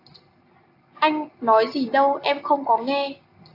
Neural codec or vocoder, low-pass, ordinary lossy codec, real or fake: none; 5.4 kHz; AAC, 32 kbps; real